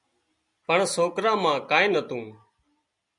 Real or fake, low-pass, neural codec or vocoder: real; 10.8 kHz; none